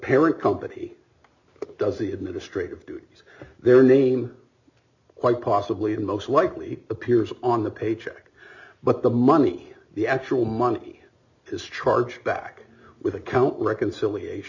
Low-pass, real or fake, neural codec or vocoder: 7.2 kHz; real; none